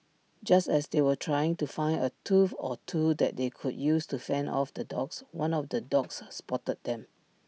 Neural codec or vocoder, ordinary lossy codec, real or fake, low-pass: none; none; real; none